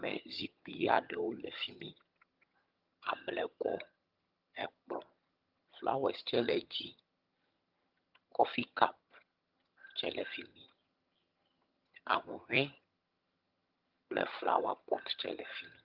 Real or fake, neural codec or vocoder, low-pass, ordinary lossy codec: fake; vocoder, 22.05 kHz, 80 mel bands, HiFi-GAN; 5.4 kHz; Opus, 24 kbps